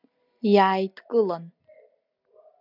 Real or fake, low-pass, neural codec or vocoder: real; 5.4 kHz; none